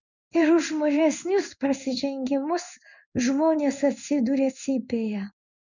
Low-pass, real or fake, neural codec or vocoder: 7.2 kHz; fake; codec, 16 kHz in and 24 kHz out, 1 kbps, XY-Tokenizer